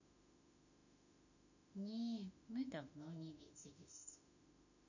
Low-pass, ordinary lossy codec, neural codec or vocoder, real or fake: 7.2 kHz; none; autoencoder, 48 kHz, 32 numbers a frame, DAC-VAE, trained on Japanese speech; fake